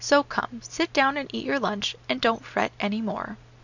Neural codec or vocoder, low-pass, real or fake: none; 7.2 kHz; real